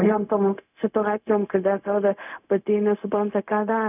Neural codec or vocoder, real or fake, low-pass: codec, 16 kHz, 0.4 kbps, LongCat-Audio-Codec; fake; 3.6 kHz